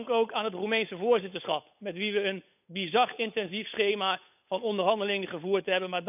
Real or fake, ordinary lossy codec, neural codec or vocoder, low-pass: fake; none; codec, 16 kHz, 8 kbps, FunCodec, trained on Chinese and English, 25 frames a second; 3.6 kHz